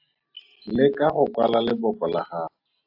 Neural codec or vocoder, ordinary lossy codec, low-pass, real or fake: none; AAC, 48 kbps; 5.4 kHz; real